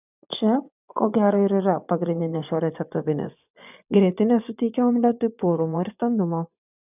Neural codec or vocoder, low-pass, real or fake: vocoder, 24 kHz, 100 mel bands, Vocos; 3.6 kHz; fake